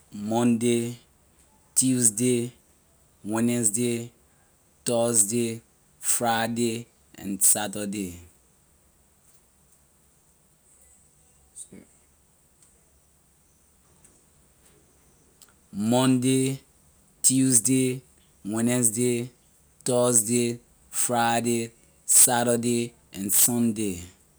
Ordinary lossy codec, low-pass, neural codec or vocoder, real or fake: none; none; none; real